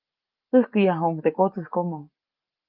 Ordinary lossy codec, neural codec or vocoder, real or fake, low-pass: Opus, 24 kbps; none; real; 5.4 kHz